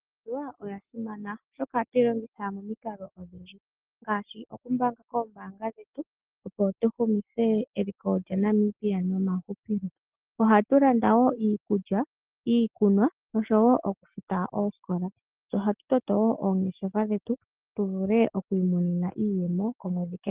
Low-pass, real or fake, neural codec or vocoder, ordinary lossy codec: 3.6 kHz; real; none; Opus, 16 kbps